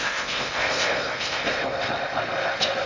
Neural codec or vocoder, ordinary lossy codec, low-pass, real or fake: codec, 16 kHz in and 24 kHz out, 0.6 kbps, FocalCodec, streaming, 2048 codes; MP3, 48 kbps; 7.2 kHz; fake